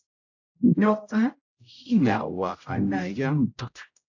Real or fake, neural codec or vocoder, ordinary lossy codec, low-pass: fake; codec, 16 kHz, 0.5 kbps, X-Codec, HuBERT features, trained on general audio; AAC, 48 kbps; 7.2 kHz